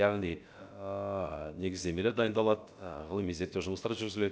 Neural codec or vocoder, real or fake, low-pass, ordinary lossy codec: codec, 16 kHz, about 1 kbps, DyCAST, with the encoder's durations; fake; none; none